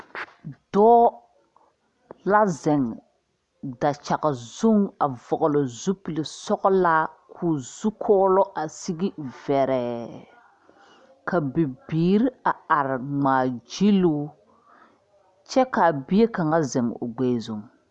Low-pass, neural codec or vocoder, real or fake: 10.8 kHz; none; real